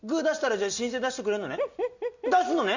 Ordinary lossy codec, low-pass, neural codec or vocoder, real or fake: none; 7.2 kHz; none; real